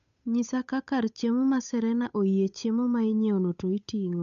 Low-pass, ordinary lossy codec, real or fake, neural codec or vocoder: 7.2 kHz; none; fake; codec, 16 kHz, 8 kbps, FunCodec, trained on Chinese and English, 25 frames a second